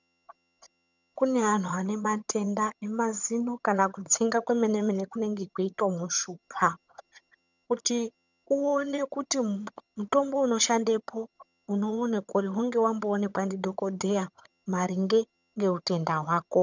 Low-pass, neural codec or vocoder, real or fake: 7.2 kHz; vocoder, 22.05 kHz, 80 mel bands, HiFi-GAN; fake